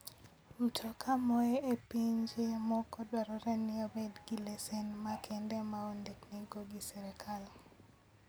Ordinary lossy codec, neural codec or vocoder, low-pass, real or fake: none; none; none; real